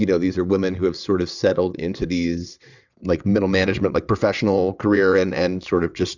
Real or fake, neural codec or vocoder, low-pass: fake; vocoder, 22.05 kHz, 80 mel bands, WaveNeXt; 7.2 kHz